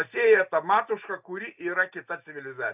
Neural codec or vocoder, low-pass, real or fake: none; 3.6 kHz; real